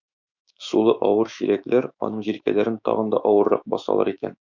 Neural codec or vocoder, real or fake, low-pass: autoencoder, 48 kHz, 128 numbers a frame, DAC-VAE, trained on Japanese speech; fake; 7.2 kHz